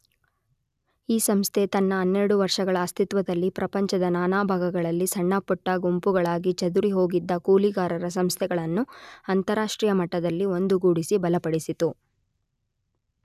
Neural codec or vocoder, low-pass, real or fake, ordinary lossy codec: none; 14.4 kHz; real; none